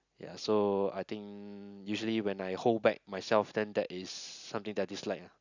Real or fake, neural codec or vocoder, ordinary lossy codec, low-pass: real; none; none; 7.2 kHz